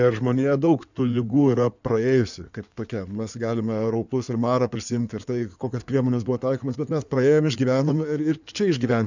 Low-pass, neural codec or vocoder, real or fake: 7.2 kHz; codec, 16 kHz in and 24 kHz out, 2.2 kbps, FireRedTTS-2 codec; fake